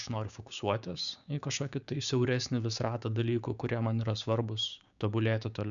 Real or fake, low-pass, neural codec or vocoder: fake; 7.2 kHz; codec, 16 kHz, 6 kbps, DAC